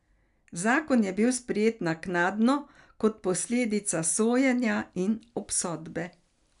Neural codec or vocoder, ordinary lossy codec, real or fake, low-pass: none; none; real; 10.8 kHz